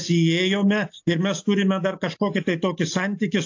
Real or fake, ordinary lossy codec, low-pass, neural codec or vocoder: real; AAC, 48 kbps; 7.2 kHz; none